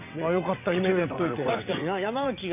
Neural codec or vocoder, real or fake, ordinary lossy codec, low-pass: vocoder, 22.05 kHz, 80 mel bands, WaveNeXt; fake; AAC, 32 kbps; 3.6 kHz